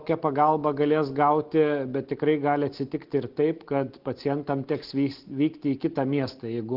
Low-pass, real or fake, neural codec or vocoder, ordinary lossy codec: 5.4 kHz; real; none; Opus, 16 kbps